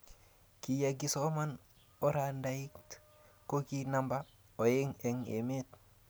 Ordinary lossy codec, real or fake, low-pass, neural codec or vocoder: none; real; none; none